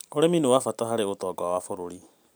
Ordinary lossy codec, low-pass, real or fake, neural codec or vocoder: none; none; fake; vocoder, 44.1 kHz, 128 mel bands every 512 samples, BigVGAN v2